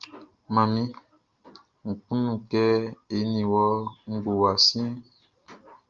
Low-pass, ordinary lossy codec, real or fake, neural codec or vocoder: 7.2 kHz; Opus, 24 kbps; real; none